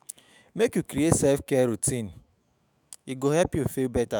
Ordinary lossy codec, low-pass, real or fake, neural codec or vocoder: none; none; fake; autoencoder, 48 kHz, 128 numbers a frame, DAC-VAE, trained on Japanese speech